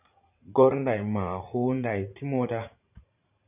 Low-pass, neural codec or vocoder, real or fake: 3.6 kHz; vocoder, 44.1 kHz, 80 mel bands, Vocos; fake